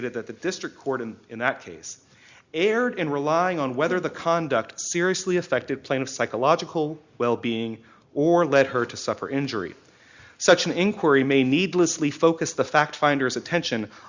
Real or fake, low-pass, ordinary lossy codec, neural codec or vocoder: real; 7.2 kHz; Opus, 64 kbps; none